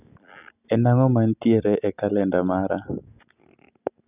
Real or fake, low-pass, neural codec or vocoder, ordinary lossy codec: real; 3.6 kHz; none; none